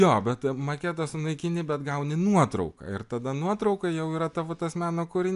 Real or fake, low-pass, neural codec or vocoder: real; 10.8 kHz; none